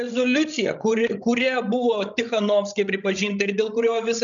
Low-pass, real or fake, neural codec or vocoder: 7.2 kHz; fake; codec, 16 kHz, 16 kbps, FreqCodec, larger model